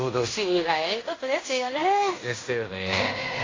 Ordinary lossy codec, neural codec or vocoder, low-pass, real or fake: AAC, 32 kbps; codec, 16 kHz in and 24 kHz out, 0.9 kbps, LongCat-Audio-Codec, fine tuned four codebook decoder; 7.2 kHz; fake